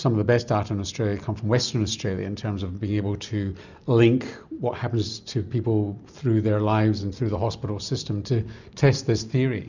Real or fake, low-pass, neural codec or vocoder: real; 7.2 kHz; none